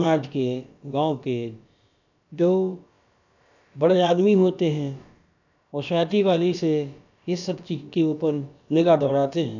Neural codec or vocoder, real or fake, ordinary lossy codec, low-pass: codec, 16 kHz, about 1 kbps, DyCAST, with the encoder's durations; fake; none; 7.2 kHz